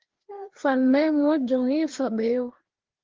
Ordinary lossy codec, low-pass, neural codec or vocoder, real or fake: Opus, 16 kbps; 7.2 kHz; codec, 16 kHz, 2 kbps, FreqCodec, larger model; fake